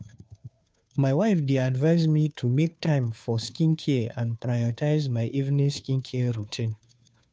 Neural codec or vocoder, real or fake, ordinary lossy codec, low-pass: codec, 16 kHz, 2 kbps, FunCodec, trained on Chinese and English, 25 frames a second; fake; none; none